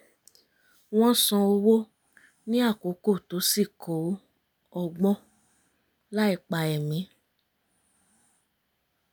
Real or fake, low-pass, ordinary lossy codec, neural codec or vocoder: real; none; none; none